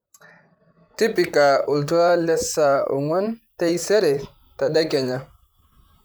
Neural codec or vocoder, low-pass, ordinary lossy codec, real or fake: vocoder, 44.1 kHz, 128 mel bands, Pupu-Vocoder; none; none; fake